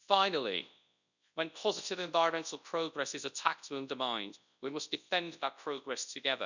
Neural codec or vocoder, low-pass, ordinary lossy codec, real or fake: codec, 24 kHz, 0.9 kbps, WavTokenizer, large speech release; 7.2 kHz; none; fake